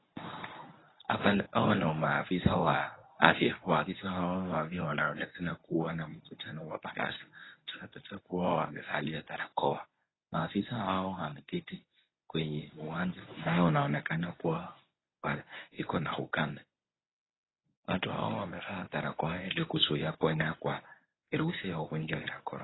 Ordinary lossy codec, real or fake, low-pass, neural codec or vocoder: AAC, 16 kbps; fake; 7.2 kHz; codec, 24 kHz, 0.9 kbps, WavTokenizer, medium speech release version 1